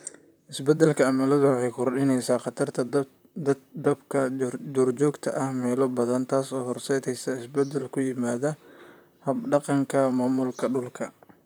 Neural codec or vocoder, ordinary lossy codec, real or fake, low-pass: vocoder, 44.1 kHz, 128 mel bands, Pupu-Vocoder; none; fake; none